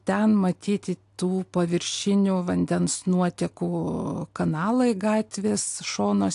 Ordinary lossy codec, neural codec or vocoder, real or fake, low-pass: AAC, 64 kbps; none; real; 10.8 kHz